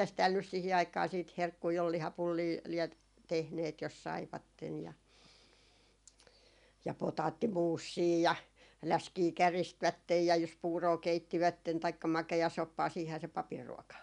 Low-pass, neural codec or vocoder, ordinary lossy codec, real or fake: 10.8 kHz; none; none; real